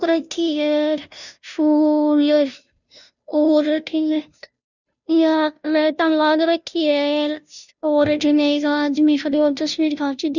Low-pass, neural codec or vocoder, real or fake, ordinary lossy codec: 7.2 kHz; codec, 16 kHz, 0.5 kbps, FunCodec, trained on Chinese and English, 25 frames a second; fake; none